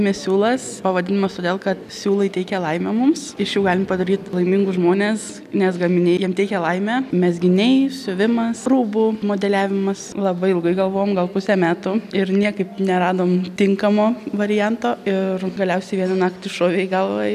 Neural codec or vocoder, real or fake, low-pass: none; real; 14.4 kHz